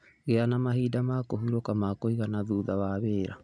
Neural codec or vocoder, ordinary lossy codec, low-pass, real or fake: none; none; 9.9 kHz; real